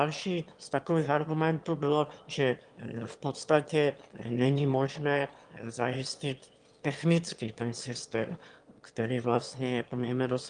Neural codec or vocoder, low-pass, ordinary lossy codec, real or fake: autoencoder, 22.05 kHz, a latent of 192 numbers a frame, VITS, trained on one speaker; 9.9 kHz; Opus, 32 kbps; fake